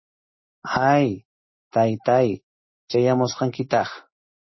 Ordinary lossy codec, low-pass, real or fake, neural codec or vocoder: MP3, 24 kbps; 7.2 kHz; real; none